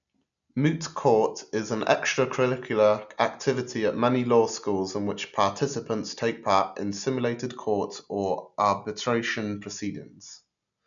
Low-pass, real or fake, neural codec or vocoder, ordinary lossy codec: 7.2 kHz; real; none; none